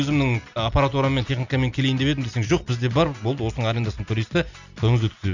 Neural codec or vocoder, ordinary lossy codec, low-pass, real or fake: none; none; 7.2 kHz; real